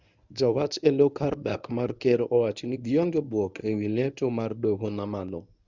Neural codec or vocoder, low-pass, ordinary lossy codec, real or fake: codec, 24 kHz, 0.9 kbps, WavTokenizer, medium speech release version 1; 7.2 kHz; none; fake